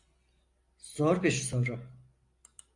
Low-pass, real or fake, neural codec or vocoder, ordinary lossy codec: 10.8 kHz; real; none; AAC, 48 kbps